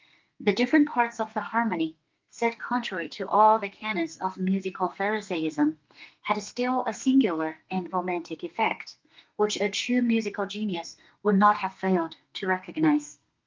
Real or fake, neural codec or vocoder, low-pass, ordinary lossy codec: fake; codec, 32 kHz, 1.9 kbps, SNAC; 7.2 kHz; Opus, 24 kbps